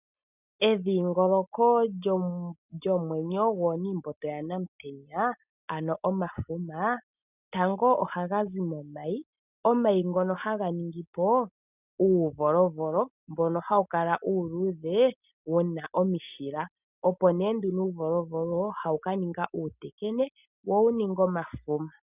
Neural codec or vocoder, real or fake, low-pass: none; real; 3.6 kHz